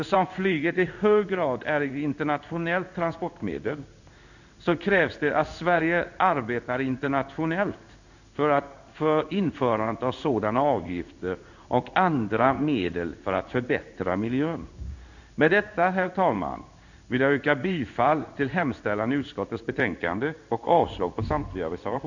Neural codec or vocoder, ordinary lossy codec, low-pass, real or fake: codec, 16 kHz in and 24 kHz out, 1 kbps, XY-Tokenizer; none; 7.2 kHz; fake